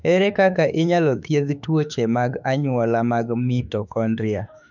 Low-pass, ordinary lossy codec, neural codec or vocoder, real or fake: 7.2 kHz; none; autoencoder, 48 kHz, 32 numbers a frame, DAC-VAE, trained on Japanese speech; fake